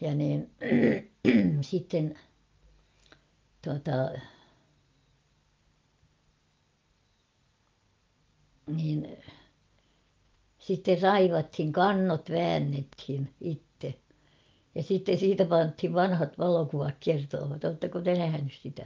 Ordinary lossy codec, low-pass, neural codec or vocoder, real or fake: Opus, 24 kbps; 7.2 kHz; none; real